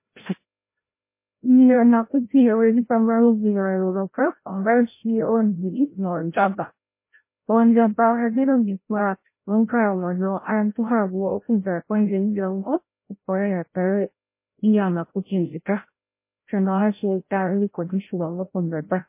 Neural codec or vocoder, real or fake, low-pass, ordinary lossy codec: codec, 16 kHz, 0.5 kbps, FreqCodec, larger model; fake; 3.6 kHz; MP3, 24 kbps